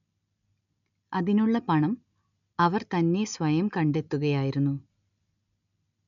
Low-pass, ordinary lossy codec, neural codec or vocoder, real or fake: 7.2 kHz; MP3, 96 kbps; none; real